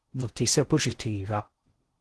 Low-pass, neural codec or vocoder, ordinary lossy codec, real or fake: 10.8 kHz; codec, 16 kHz in and 24 kHz out, 0.6 kbps, FocalCodec, streaming, 4096 codes; Opus, 16 kbps; fake